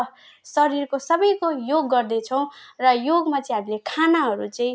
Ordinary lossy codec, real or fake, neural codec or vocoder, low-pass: none; real; none; none